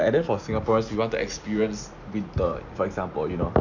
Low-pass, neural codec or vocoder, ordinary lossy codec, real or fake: 7.2 kHz; none; none; real